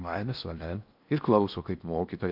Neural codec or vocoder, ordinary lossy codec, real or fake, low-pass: codec, 16 kHz in and 24 kHz out, 0.8 kbps, FocalCodec, streaming, 65536 codes; MP3, 48 kbps; fake; 5.4 kHz